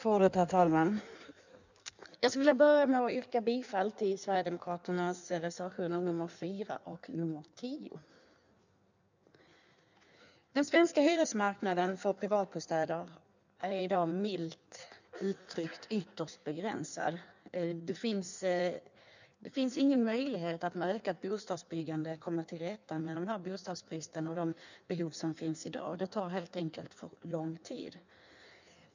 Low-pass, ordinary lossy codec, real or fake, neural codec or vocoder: 7.2 kHz; none; fake; codec, 16 kHz in and 24 kHz out, 1.1 kbps, FireRedTTS-2 codec